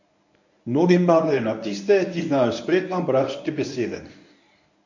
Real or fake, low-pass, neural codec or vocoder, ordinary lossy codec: fake; 7.2 kHz; codec, 24 kHz, 0.9 kbps, WavTokenizer, medium speech release version 1; none